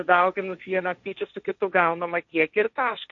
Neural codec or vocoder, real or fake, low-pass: codec, 16 kHz, 1.1 kbps, Voila-Tokenizer; fake; 7.2 kHz